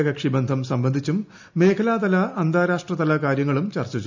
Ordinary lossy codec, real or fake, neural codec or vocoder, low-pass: MP3, 64 kbps; real; none; 7.2 kHz